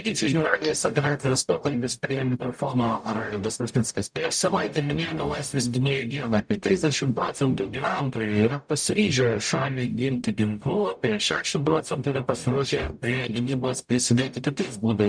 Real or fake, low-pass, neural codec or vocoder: fake; 9.9 kHz; codec, 44.1 kHz, 0.9 kbps, DAC